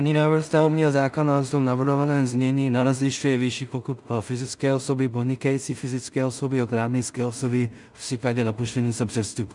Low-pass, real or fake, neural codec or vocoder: 10.8 kHz; fake; codec, 16 kHz in and 24 kHz out, 0.4 kbps, LongCat-Audio-Codec, two codebook decoder